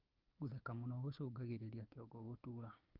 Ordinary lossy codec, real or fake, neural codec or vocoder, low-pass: Opus, 32 kbps; fake; codec, 16 kHz, 6 kbps, DAC; 5.4 kHz